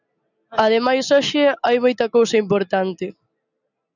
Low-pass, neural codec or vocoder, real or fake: 7.2 kHz; none; real